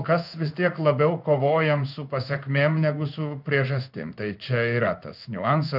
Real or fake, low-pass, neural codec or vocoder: fake; 5.4 kHz; codec, 16 kHz in and 24 kHz out, 1 kbps, XY-Tokenizer